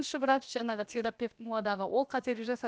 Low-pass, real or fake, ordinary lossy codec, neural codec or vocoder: none; fake; none; codec, 16 kHz, about 1 kbps, DyCAST, with the encoder's durations